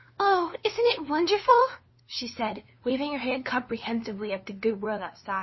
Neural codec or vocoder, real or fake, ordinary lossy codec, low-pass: codec, 16 kHz, 2 kbps, FunCodec, trained on LibriTTS, 25 frames a second; fake; MP3, 24 kbps; 7.2 kHz